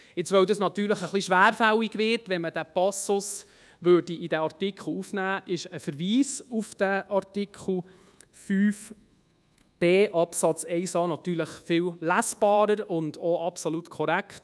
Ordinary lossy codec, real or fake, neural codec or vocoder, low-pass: none; fake; codec, 24 kHz, 1.2 kbps, DualCodec; none